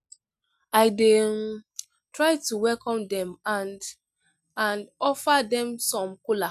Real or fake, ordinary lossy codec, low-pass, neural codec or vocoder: real; none; 14.4 kHz; none